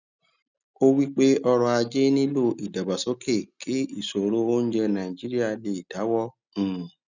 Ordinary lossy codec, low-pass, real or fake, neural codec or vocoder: none; 7.2 kHz; real; none